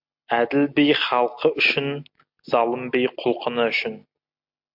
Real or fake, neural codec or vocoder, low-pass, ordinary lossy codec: real; none; 5.4 kHz; MP3, 48 kbps